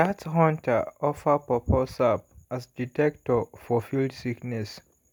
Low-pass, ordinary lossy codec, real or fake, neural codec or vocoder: none; none; real; none